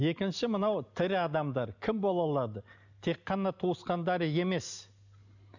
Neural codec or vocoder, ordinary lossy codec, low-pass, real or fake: none; none; 7.2 kHz; real